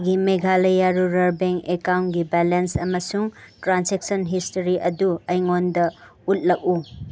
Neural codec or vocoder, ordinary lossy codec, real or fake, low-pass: none; none; real; none